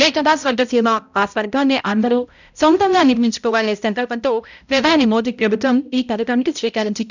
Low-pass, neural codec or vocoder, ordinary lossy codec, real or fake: 7.2 kHz; codec, 16 kHz, 0.5 kbps, X-Codec, HuBERT features, trained on balanced general audio; none; fake